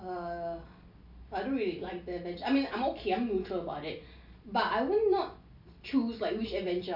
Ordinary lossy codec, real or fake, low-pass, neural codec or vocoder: none; real; 5.4 kHz; none